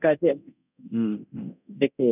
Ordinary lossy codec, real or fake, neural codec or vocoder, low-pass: none; fake; codec, 24 kHz, 0.9 kbps, DualCodec; 3.6 kHz